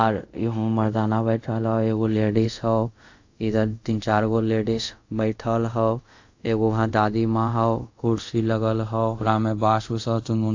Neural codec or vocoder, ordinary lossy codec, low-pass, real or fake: codec, 24 kHz, 0.5 kbps, DualCodec; AAC, 48 kbps; 7.2 kHz; fake